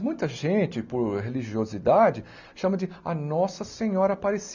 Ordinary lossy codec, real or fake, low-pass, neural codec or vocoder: none; real; 7.2 kHz; none